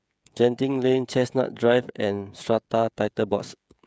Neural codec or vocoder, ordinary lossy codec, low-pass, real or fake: codec, 16 kHz, 16 kbps, FreqCodec, smaller model; none; none; fake